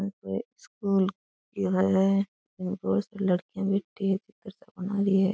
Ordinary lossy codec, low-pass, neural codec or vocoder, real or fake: none; none; none; real